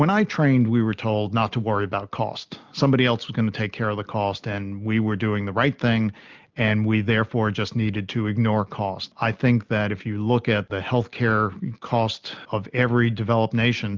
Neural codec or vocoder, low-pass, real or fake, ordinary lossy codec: none; 7.2 kHz; real; Opus, 24 kbps